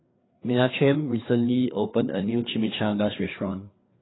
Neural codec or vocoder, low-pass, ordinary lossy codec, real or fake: codec, 16 kHz, 4 kbps, FreqCodec, larger model; 7.2 kHz; AAC, 16 kbps; fake